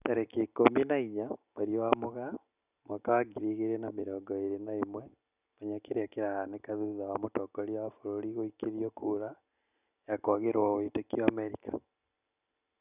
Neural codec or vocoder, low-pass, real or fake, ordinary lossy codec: none; 3.6 kHz; real; none